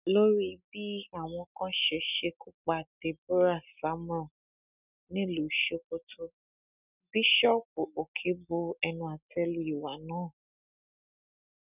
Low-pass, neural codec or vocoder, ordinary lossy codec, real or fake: 3.6 kHz; none; none; real